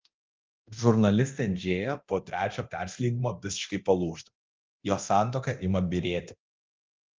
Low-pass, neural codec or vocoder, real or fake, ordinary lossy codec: 7.2 kHz; codec, 24 kHz, 0.9 kbps, DualCodec; fake; Opus, 24 kbps